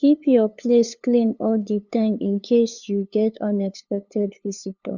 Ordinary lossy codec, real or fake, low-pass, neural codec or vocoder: none; fake; 7.2 kHz; codec, 16 kHz, 2 kbps, FunCodec, trained on LibriTTS, 25 frames a second